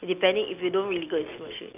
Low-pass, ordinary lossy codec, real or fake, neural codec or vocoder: 3.6 kHz; none; real; none